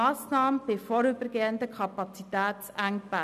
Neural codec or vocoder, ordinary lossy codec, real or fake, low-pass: none; none; real; 14.4 kHz